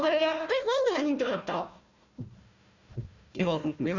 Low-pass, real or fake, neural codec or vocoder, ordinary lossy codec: 7.2 kHz; fake; codec, 16 kHz, 1 kbps, FunCodec, trained on Chinese and English, 50 frames a second; none